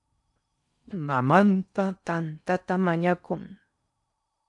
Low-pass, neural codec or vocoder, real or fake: 10.8 kHz; codec, 16 kHz in and 24 kHz out, 0.6 kbps, FocalCodec, streaming, 4096 codes; fake